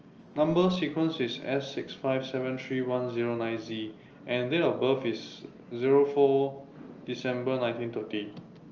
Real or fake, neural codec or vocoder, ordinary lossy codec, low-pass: real; none; Opus, 24 kbps; 7.2 kHz